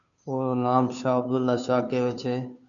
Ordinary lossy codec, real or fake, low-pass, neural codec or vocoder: MP3, 96 kbps; fake; 7.2 kHz; codec, 16 kHz, 2 kbps, FunCodec, trained on Chinese and English, 25 frames a second